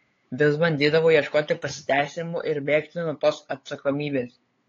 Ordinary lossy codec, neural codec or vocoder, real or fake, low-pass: AAC, 32 kbps; codec, 16 kHz, 8 kbps, FunCodec, trained on LibriTTS, 25 frames a second; fake; 7.2 kHz